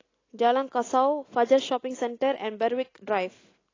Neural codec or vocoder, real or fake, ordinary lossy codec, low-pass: none; real; AAC, 32 kbps; 7.2 kHz